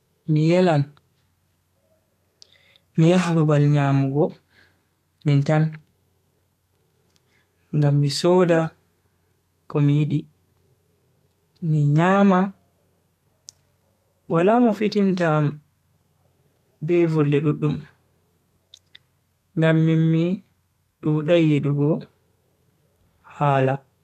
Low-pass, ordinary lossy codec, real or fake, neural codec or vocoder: 14.4 kHz; none; fake; codec, 32 kHz, 1.9 kbps, SNAC